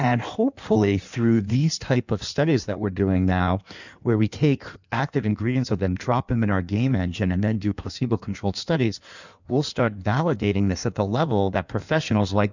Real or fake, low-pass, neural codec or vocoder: fake; 7.2 kHz; codec, 16 kHz in and 24 kHz out, 1.1 kbps, FireRedTTS-2 codec